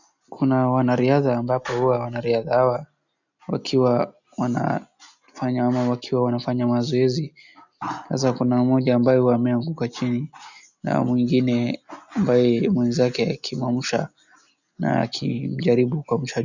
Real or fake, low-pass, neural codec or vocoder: real; 7.2 kHz; none